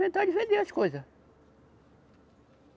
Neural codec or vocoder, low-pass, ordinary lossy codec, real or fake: none; none; none; real